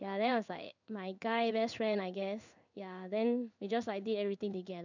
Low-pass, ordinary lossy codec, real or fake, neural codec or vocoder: 7.2 kHz; none; fake; codec, 16 kHz in and 24 kHz out, 1 kbps, XY-Tokenizer